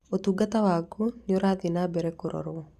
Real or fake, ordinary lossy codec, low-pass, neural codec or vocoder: real; Opus, 64 kbps; 14.4 kHz; none